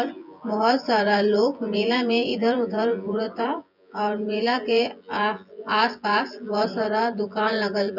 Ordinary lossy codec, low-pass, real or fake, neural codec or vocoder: none; 5.4 kHz; fake; vocoder, 24 kHz, 100 mel bands, Vocos